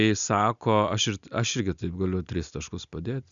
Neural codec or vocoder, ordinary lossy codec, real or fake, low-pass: none; MP3, 96 kbps; real; 7.2 kHz